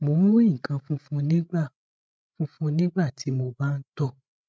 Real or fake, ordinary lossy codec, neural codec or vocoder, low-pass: fake; none; codec, 16 kHz, 16 kbps, FreqCodec, larger model; none